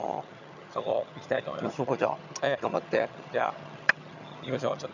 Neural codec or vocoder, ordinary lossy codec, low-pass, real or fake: vocoder, 22.05 kHz, 80 mel bands, HiFi-GAN; none; 7.2 kHz; fake